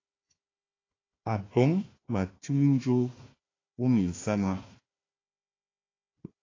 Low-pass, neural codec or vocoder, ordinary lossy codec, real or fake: 7.2 kHz; codec, 16 kHz, 1 kbps, FunCodec, trained on Chinese and English, 50 frames a second; AAC, 32 kbps; fake